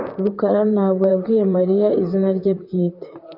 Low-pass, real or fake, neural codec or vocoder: 5.4 kHz; fake; vocoder, 44.1 kHz, 128 mel bands, Pupu-Vocoder